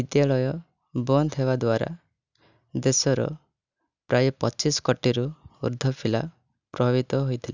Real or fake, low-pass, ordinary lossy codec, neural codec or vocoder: real; 7.2 kHz; none; none